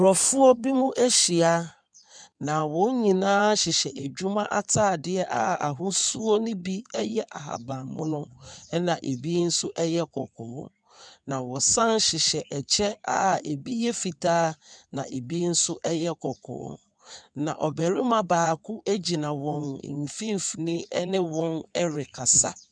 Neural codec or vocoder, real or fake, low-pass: codec, 16 kHz in and 24 kHz out, 2.2 kbps, FireRedTTS-2 codec; fake; 9.9 kHz